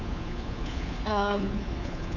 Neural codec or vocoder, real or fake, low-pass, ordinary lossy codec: codec, 24 kHz, 3.1 kbps, DualCodec; fake; 7.2 kHz; none